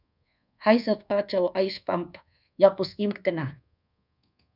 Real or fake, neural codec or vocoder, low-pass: fake; codec, 24 kHz, 1.2 kbps, DualCodec; 5.4 kHz